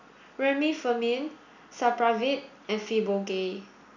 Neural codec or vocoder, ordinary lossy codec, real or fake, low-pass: none; none; real; 7.2 kHz